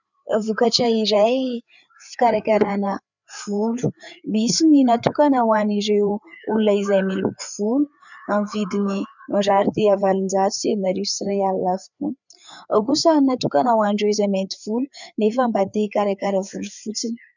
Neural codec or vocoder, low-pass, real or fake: codec, 16 kHz, 4 kbps, FreqCodec, larger model; 7.2 kHz; fake